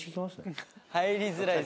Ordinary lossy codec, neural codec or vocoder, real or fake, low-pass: none; none; real; none